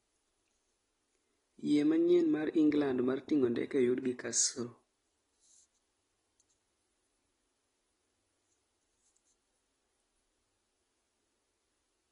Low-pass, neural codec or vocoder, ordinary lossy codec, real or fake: 10.8 kHz; none; AAC, 32 kbps; real